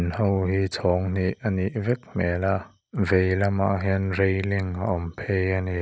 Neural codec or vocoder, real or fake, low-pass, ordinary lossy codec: none; real; none; none